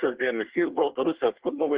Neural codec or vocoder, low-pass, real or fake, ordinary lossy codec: codec, 24 kHz, 1 kbps, SNAC; 3.6 kHz; fake; Opus, 16 kbps